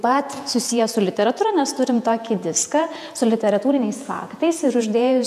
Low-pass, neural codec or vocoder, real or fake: 14.4 kHz; vocoder, 48 kHz, 128 mel bands, Vocos; fake